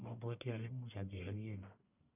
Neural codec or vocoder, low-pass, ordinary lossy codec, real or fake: codec, 44.1 kHz, 1.7 kbps, Pupu-Codec; 3.6 kHz; none; fake